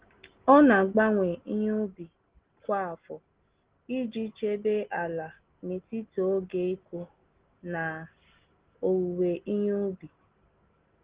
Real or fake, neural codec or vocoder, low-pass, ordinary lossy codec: real; none; 3.6 kHz; Opus, 16 kbps